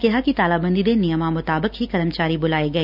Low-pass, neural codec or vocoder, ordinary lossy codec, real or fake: 5.4 kHz; none; none; real